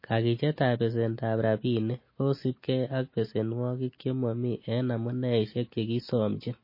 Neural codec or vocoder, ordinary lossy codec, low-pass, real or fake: none; MP3, 24 kbps; 5.4 kHz; real